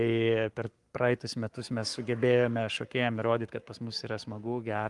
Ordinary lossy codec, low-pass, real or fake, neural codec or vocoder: Opus, 32 kbps; 10.8 kHz; fake; codec, 44.1 kHz, 7.8 kbps, Pupu-Codec